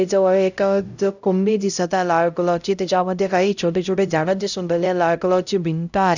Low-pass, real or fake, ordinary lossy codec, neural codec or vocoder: 7.2 kHz; fake; none; codec, 16 kHz, 0.5 kbps, X-Codec, HuBERT features, trained on LibriSpeech